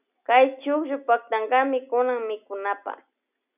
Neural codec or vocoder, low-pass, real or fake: none; 3.6 kHz; real